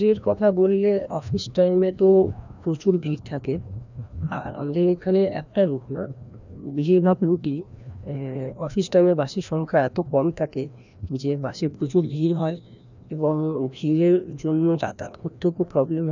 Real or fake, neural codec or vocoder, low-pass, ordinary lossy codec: fake; codec, 16 kHz, 1 kbps, FreqCodec, larger model; 7.2 kHz; none